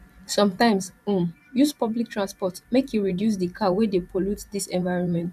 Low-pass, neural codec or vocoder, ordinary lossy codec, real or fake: 14.4 kHz; vocoder, 44.1 kHz, 128 mel bands every 512 samples, BigVGAN v2; none; fake